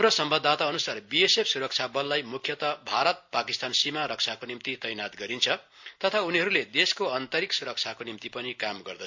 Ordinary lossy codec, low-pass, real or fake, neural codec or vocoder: MP3, 48 kbps; 7.2 kHz; real; none